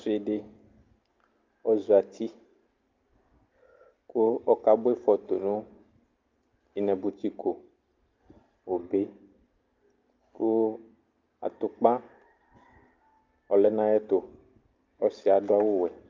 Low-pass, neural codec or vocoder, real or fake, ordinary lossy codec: 7.2 kHz; none; real; Opus, 24 kbps